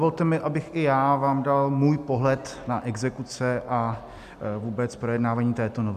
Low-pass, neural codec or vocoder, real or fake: 14.4 kHz; none; real